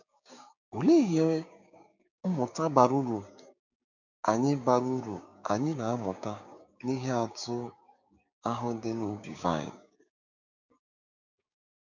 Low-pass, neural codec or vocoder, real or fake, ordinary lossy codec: 7.2 kHz; codec, 16 kHz, 6 kbps, DAC; fake; none